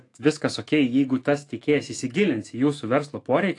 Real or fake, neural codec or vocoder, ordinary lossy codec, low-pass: fake; autoencoder, 48 kHz, 128 numbers a frame, DAC-VAE, trained on Japanese speech; AAC, 48 kbps; 10.8 kHz